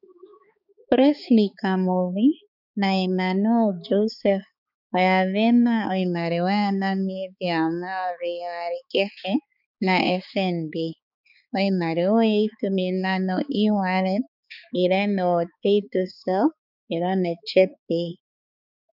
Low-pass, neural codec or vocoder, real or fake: 5.4 kHz; codec, 16 kHz, 4 kbps, X-Codec, HuBERT features, trained on balanced general audio; fake